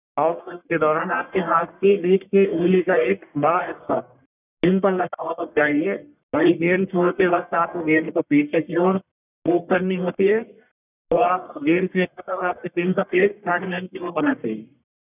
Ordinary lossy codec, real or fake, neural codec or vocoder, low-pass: none; fake; codec, 44.1 kHz, 1.7 kbps, Pupu-Codec; 3.6 kHz